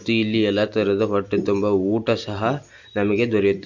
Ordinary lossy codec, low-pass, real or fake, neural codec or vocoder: MP3, 48 kbps; 7.2 kHz; real; none